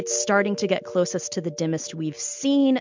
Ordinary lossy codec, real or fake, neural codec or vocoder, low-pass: MP3, 64 kbps; real; none; 7.2 kHz